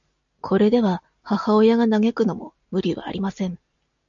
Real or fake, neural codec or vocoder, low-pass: real; none; 7.2 kHz